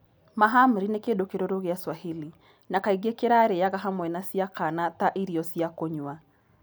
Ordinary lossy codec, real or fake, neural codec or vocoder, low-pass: none; real; none; none